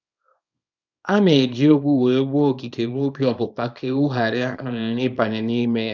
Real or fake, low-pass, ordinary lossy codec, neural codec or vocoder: fake; 7.2 kHz; none; codec, 24 kHz, 0.9 kbps, WavTokenizer, small release